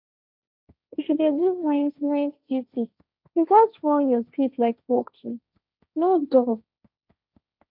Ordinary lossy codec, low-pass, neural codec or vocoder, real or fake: none; 5.4 kHz; codec, 16 kHz, 1.1 kbps, Voila-Tokenizer; fake